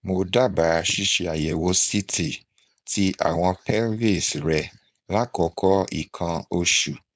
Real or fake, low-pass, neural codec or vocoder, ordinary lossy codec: fake; none; codec, 16 kHz, 4.8 kbps, FACodec; none